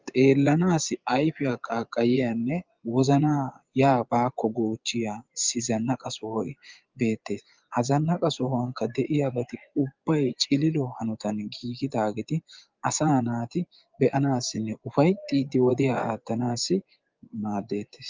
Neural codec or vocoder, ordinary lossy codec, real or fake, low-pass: vocoder, 24 kHz, 100 mel bands, Vocos; Opus, 24 kbps; fake; 7.2 kHz